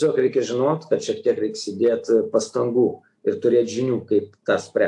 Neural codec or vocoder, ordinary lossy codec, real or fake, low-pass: none; AAC, 64 kbps; real; 10.8 kHz